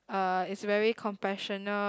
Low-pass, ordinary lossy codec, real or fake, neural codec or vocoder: none; none; real; none